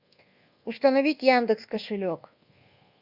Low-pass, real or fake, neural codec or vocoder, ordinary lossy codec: 5.4 kHz; fake; codec, 24 kHz, 1.2 kbps, DualCodec; Opus, 64 kbps